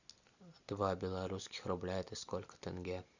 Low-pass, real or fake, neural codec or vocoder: 7.2 kHz; real; none